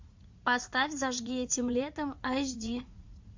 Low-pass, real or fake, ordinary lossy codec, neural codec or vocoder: 7.2 kHz; fake; MP3, 48 kbps; vocoder, 44.1 kHz, 80 mel bands, Vocos